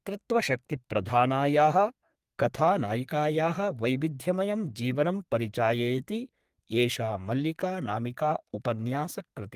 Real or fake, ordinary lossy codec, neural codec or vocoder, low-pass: fake; Opus, 32 kbps; codec, 32 kHz, 1.9 kbps, SNAC; 14.4 kHz